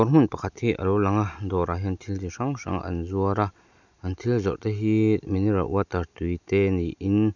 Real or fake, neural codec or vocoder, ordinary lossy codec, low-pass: real; none; none; 7.2 kHz